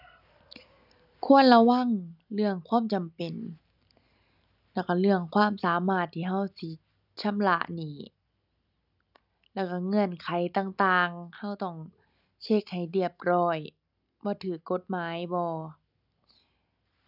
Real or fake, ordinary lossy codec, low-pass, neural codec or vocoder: real; none; 5.4 kHz; none